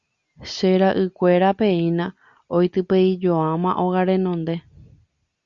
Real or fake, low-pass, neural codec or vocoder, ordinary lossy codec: real; 7.2 kHz; none; Opus, 64 kbps